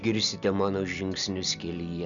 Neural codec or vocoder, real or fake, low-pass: none; real; 7.2 kHz